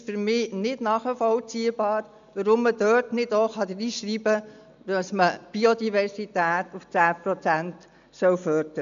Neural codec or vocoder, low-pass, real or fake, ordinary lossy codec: none; 7.2 kHz; real; AAC, 96 kbps